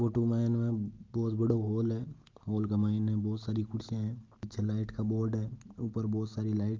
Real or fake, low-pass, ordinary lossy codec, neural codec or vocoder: real; 7.2 kHz; Opus, 32 kbps; none